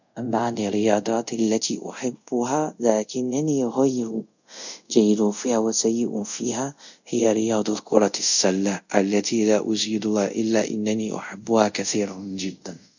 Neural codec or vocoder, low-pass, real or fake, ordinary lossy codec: codec, 24 kHz, 0.5 kbps, DualCodec; 7.2 kHz; fake; none